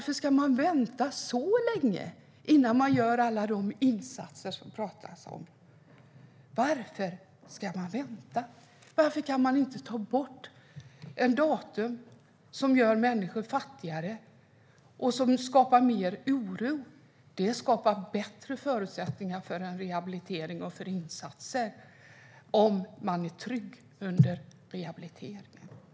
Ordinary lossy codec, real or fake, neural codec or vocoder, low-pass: none; real; none; none